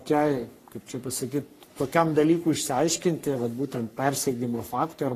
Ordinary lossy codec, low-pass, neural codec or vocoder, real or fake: AAC, 48 kbps; 14.4 kHz; codec, 44.1 kHz, 3.4 kbps, Pupu-Codec; fake